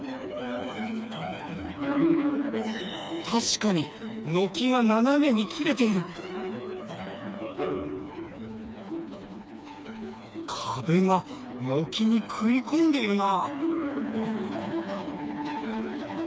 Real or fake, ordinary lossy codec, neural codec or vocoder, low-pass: fake; none; codec, 16 kHz, 2 kbps, FreqCodec, smaller model; none